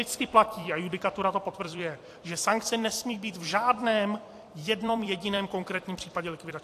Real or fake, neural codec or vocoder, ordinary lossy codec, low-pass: fake; vocoder, 44.1 kHz, 128 mel bands every 256 samples, BigVGAN v2; AAC, 64 kbps; 14.4 kHz